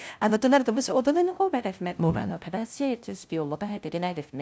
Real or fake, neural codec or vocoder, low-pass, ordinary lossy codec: fake; codec, 16 kHz, 0.5 kbps, FunCodec, trained on LibriTTS, 25 frames a second; none; none